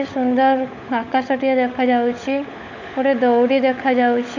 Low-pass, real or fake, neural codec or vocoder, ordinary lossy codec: 7.2 kHz; fake; codec, 16 kHz, 16 kbps, FunCodec, trained on LibriTTS, 50 frames a second; none